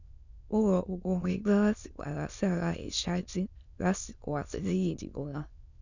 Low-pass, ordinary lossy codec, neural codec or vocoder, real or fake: 7.2 kHz; none; autoencoder, 22.05 kHz, a latent of 192 numbers a frame, VITS, trained on many speakers; fake